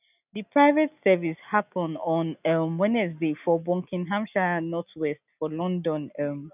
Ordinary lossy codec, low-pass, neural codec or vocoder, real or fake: none; 3.6 kHz; none; real